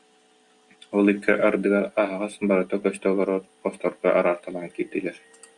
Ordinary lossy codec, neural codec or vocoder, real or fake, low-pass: Opus, 64 kbps; none; real; 10.8 kHz